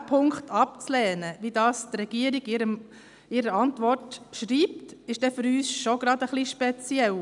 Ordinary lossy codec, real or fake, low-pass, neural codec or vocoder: none; real; 10.8 kHz; none